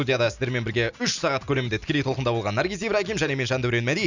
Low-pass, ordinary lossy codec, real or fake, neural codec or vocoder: 7.2 kHz; none; real; none